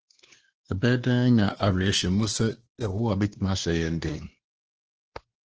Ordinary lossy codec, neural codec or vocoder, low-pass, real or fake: Opus, 16 kbps; codec, 16 kHz, 2 kbps, X-Codec, WavLM features, trained on Multilingual LibriSpeech; 7.2 kHz; fake